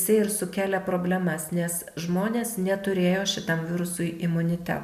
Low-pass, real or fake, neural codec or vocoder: 14.4 kHz; real; none